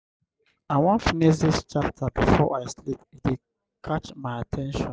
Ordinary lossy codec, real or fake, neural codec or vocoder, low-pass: none; real; none; none